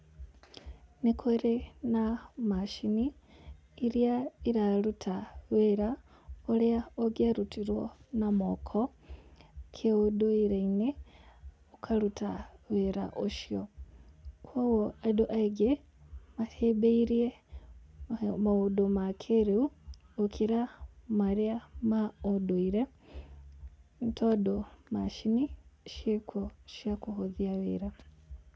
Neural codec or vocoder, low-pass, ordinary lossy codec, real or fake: none; none; none; real